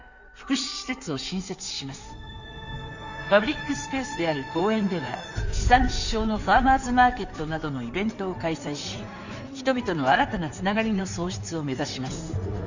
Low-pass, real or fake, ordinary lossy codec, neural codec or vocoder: 7.2 kHz; fake; none; codec, 16 kHz in and 24 kHz out, 2.2 kbps, FireRedTTS-2 codec